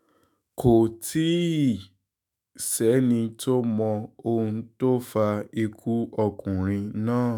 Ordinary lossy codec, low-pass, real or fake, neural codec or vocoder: none; none; fake; autoencoder, 48 kHz, 128 numbers a frame, DAC-VAE, trained on Japanese speech